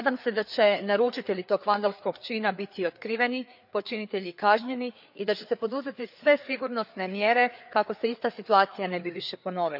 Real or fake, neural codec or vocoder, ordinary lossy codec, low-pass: fake; codec, 16 kHz, 4 kbps, FreqCodec, larger model; none; 5.4 kHz